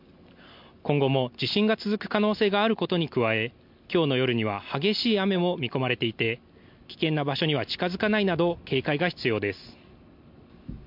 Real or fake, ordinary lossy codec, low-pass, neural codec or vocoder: real; MP3, 48 kbps; 5.4 kHz; none